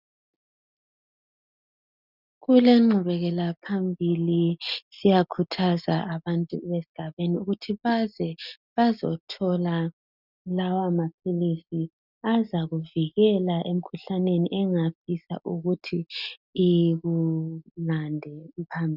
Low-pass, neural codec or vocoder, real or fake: 5.4 kHz; none; real